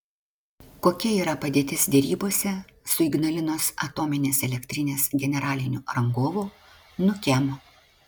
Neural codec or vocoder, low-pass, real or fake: none; 19.8 kHz; real